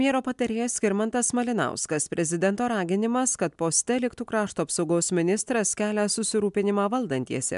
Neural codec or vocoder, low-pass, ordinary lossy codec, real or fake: none; 10.8 kHz; MP3, 96 kbps; real